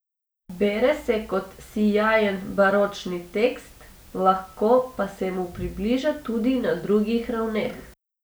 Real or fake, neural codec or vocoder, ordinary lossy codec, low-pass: real; none; none; none